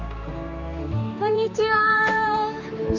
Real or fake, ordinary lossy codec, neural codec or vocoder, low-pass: fake; none; codec, 16 kHz, 2 kbps, X-Codec, HuBERT features, trained on general audio; 7.2 kHz